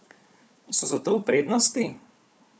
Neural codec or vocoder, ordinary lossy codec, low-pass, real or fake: codec, 16 kHz, 4 kbps, FunCodec, trained on Chinese and English, 50 frames a second; none; none; fake